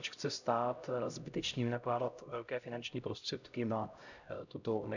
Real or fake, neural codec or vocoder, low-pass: fake; codec, 16 kHz, 0.5 kbps, X-Codec, HuBERT features, trained on LibriSpeech; 7.2 kHz